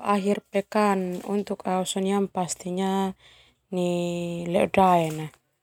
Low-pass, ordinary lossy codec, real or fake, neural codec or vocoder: 19.8 kHz; none; real; none